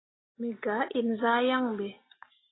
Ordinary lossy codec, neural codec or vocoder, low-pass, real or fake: AAC, 16 kbps; none; 7.2 kHz; real